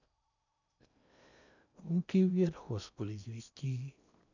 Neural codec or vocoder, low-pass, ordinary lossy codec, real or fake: codec, 16 kHz in and 24 kHz out, 0.8 kbps, FocalCodec, streaming, 65536 codes; 7.2 kHz; none; fake